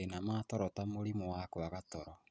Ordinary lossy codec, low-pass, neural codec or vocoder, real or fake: none; none; none; real